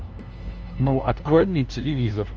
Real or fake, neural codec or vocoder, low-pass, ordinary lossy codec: fake; codec, 16 kHz, 0.5 kbps, FunCodec, trained on LibriTTS, 25 frames a second; 7.2 kHz; Opus, 24 kbps